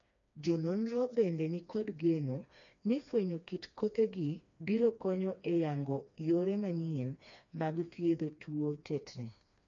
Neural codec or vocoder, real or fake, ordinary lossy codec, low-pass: codec, 16 kHz, 2 kbps, FreqCodec, smaller model; fake; MP3, 48 kbps; 7.2 kHz